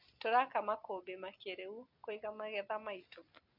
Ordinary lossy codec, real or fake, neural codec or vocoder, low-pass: MP3, 48 kbps; real; none; 5.4 kHz